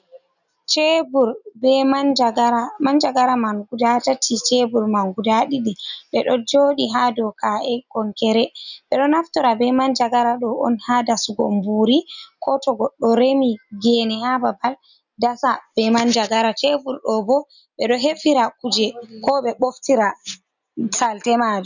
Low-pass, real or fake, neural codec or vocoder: 7.2 kHz; real; none